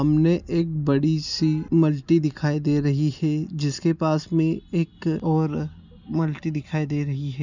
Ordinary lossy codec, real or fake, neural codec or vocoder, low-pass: none; real; none; 7.2 kHz